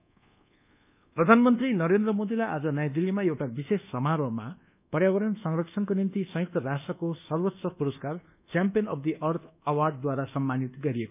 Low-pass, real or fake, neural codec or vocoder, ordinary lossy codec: 3.6 kHz; fake; codec, 24 kHz, 1.2 kbps, DualCodec; none